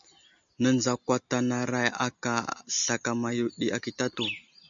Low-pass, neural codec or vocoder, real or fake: 7.2 kHz; none; real